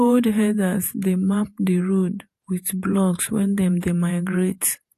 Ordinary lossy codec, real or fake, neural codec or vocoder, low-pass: AAC, 64 kbps; fake; vocoder, 48 kHz, 128 mel bands, Vocos; 14.4 kHz